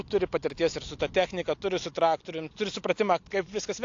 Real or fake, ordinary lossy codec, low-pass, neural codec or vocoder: real; AAC, 48 kbps; 7.2 kHz; none